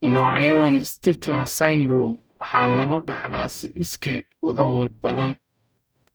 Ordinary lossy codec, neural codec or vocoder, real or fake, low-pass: none; codec, 44.1 kHz, 0.9 kbps, DAC; fake; none